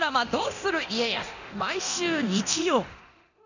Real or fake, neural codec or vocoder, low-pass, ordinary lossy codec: fake; codec, 24 kHz, 0.9 kbps, DualCodec; 7.2 kHz; none